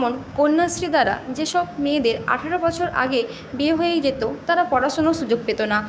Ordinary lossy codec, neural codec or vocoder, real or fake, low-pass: none; codec, 16 kHz, 6 kbps, DAC; fake; none